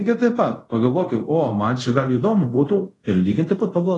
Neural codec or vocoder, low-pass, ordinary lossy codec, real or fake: codec, 24 kHz, 0.5 kbps, DualCodec; 10.8 kHz; AAC, 32 kbps; fake